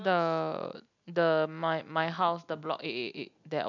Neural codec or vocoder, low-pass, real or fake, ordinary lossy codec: none; 7.2 kHz; real; none